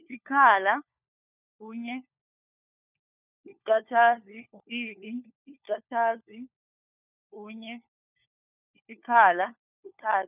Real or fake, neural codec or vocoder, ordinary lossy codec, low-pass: fake; codec, 16 kHz, 4 kbps, FunCodec, trained on LibriTTS, 50 frames a second; none; 3.6 kHz